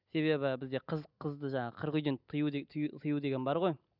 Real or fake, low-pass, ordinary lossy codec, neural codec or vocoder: real; 5.4 kHz; none; none